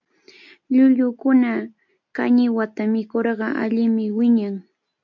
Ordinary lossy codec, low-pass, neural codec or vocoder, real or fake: MP3, 48 kbps; 7.2 kHz; none; real